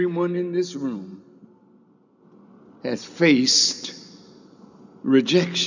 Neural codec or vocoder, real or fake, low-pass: none; real; 7.2 kHz